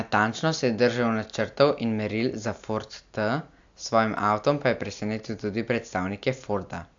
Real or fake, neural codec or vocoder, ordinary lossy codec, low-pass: real; none; AAC, 64 kbps; 7.2 kHz